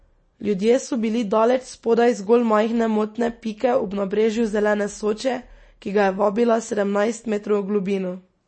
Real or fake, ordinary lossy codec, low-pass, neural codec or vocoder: real; MP3, 32 kbps; 9.9 kHz; none